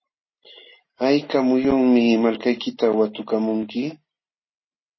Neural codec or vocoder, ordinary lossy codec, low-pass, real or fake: none; MP3, 24 kbps; 7.2 kHz; real